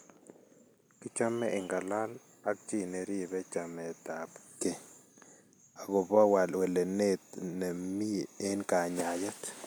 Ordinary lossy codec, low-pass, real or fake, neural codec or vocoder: none; none; real; none